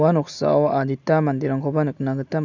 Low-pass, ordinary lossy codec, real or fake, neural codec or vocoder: 7.2 kHz; none; real; none